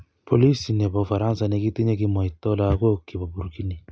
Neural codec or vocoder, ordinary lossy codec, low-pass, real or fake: none; none; none; real